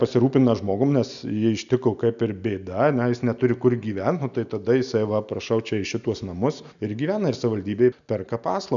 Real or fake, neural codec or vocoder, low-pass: real; none; 7.2 kHz